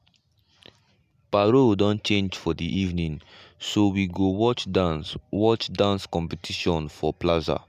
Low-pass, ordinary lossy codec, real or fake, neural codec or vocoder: 14.4 kHz; none; real; none